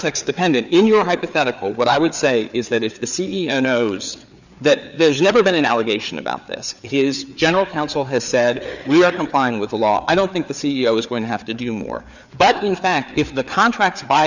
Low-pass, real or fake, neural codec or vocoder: 7.2 kHz; fake; codec, 16 kHz, 4 kbps, FreqCodec, larger model